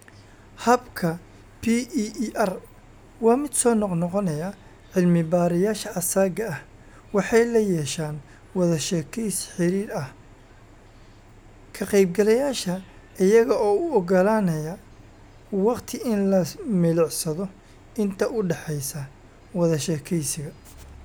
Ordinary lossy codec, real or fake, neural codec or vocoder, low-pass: none; real; none; none